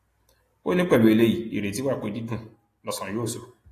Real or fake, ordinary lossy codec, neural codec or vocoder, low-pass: real; AAC, 48 kbps; none; 14.4 kHz